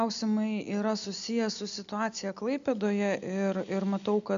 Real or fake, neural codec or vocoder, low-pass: real; none; 7.2 kHz